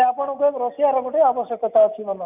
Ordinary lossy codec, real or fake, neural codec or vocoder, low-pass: none; real; none; 3.6 kHz